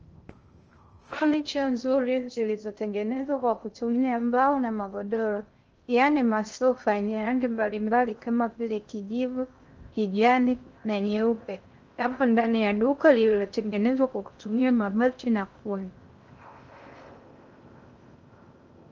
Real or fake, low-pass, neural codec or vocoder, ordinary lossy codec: fake; 7.2 kHz; codec, 16 kHz in and 24 kHz out, 0.6 kbps, FocalCodec, streaming, 2048 codes; Opus, 24 kbps